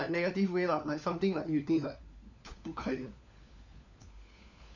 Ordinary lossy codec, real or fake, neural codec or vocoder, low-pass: none; fake; codec, 16 kHz, 4 kbps, FunCodec, trained on LibriTTS, 50 frames a second; 7.2 kHz